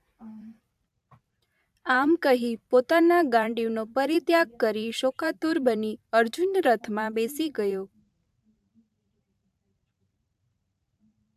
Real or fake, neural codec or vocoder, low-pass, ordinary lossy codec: fake; vocoder, 44.1 kHz, 128 mel bands every 512 samples, BigVGAN v2; 14.4 kHz; AAC, 96 kbps